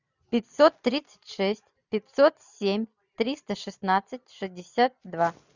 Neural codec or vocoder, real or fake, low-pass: none; real; 7.2 kHz